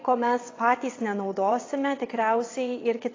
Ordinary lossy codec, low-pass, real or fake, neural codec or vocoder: AAC, 32 kbps; 7.2 kHz; fake; vocoder, 22.05 kHz, 80 mel bands, WaveNeXt